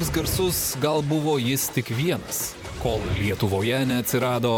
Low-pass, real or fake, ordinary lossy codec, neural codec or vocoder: 19.8 kHz; fake; MP3, 96 kbps; vocoder, 44.1 kHz, 128 mel bands, Pupu-Vocoder